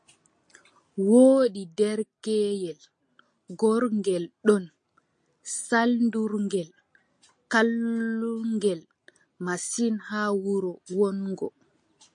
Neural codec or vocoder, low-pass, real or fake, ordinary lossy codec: none; 9.9 kHz; real; MP3, 48 kbps